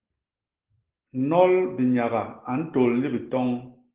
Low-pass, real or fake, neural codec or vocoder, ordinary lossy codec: 3.6 kHz; real; none; Opus, 16 kbps